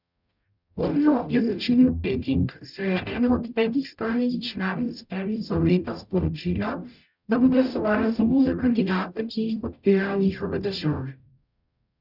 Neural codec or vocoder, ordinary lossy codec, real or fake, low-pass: codec, 44.1 kHz, 0.9 kbps, DAC; none; fake; 5.4 kHz